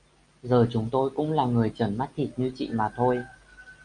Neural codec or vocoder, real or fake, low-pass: none; real; 9.9 kHz